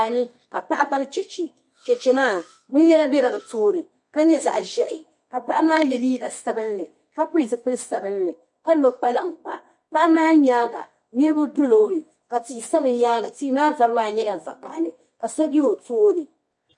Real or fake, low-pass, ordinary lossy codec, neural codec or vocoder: fake; 10.8 kHz; MP3, 48 kbps; codec, 24 kHz, 0.9 kbps, WavTokenizer, medium music audio release